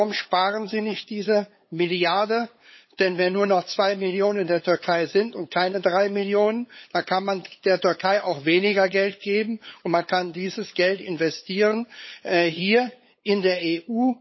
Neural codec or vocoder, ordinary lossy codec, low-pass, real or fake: codec, 16 kHz, 8 kbps, FunCodec, trained on LibriTTS, 25 frames a second; MP3, 24 kbps; 7.2 kHz; fake